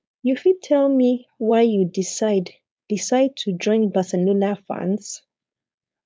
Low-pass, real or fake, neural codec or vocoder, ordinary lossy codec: none; fake; codec, 16 kHz, 4.8 kbps, FACodec; none